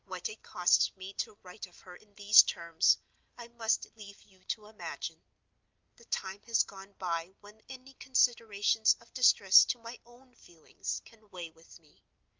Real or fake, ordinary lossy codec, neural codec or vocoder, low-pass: real; Opus, 32 kbps; none; 7.2 kHz